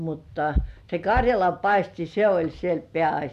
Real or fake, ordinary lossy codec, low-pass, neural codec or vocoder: real; AAC, 96 kbps; 14.4 kHz; none